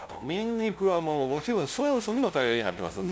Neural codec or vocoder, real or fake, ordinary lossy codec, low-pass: codec, 16 kHz, 0.5 kbps, FunCodec, trained on LibriTTS, 25 frames a second; fake; none; none